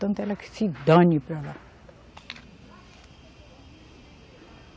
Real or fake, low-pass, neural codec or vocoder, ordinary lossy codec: real; none; none; none